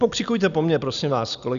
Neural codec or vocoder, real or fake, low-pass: none; real; 7.2 kHz